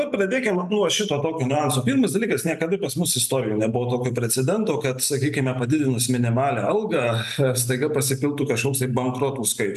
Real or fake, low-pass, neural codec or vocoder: real; 14.4 kHz; none